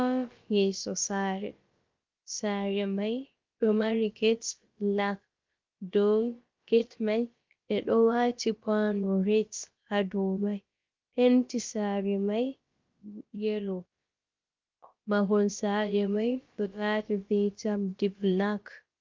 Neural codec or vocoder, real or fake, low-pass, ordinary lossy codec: codec, 16 kHz, about 1 kbps, DyCAST, with the encoder's durations; fake; 7.2 kHz; Opus, 32 kbps